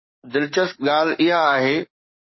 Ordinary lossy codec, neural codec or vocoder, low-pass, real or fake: MP3, 24 kbps; codec, 44.1 kHz, 7.8 kbps, DAC; 7.2 kHz; fake